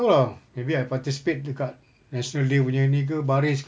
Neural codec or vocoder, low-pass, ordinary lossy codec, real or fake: none; none; none; real